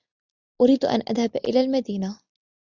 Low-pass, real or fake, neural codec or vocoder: 7.2 kHz; real; none